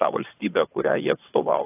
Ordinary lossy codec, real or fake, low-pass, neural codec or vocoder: AAC, 24 kbps; fake; 3.6 kHz; vocoder, 22.05 kHz, 80 mel bands, WaveNeXt